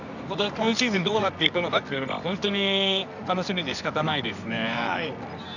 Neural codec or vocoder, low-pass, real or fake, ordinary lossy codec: codec, 24 kHz, 0.9 kbps, WavTokenizer, medium music audio release; 7.2 kHz; fake; none